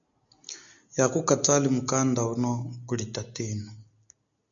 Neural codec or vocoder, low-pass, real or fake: none; 7.2 kHz; real